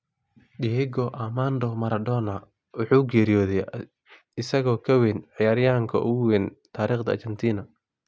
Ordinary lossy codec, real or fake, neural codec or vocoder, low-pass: none; real; none; none